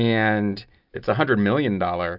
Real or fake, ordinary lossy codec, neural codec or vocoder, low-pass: real; Opus, 64 kbps; none; 5.4 kHz